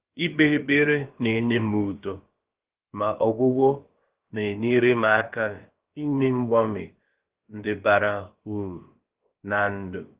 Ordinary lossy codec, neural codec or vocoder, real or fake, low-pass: Opus, 16 kbps; codec, 16 kHz, about 1 kbps, DyCAST, with the encoder's durations; fake; 3.6 kHz